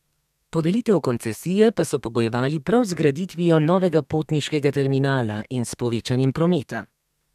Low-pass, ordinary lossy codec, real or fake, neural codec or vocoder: 14.4 kHz; none; fake; codec, 32 kHz, 1.9 kbps, SNAC